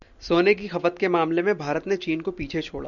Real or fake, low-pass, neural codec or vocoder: real; 7.2 kHz; none